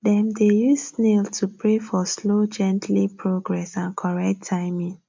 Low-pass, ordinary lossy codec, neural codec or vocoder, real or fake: 7.2 kHz; MP3, 64 kbps; none; real